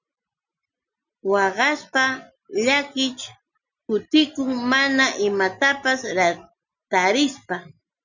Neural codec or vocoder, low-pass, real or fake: none; 7.2 kHz; real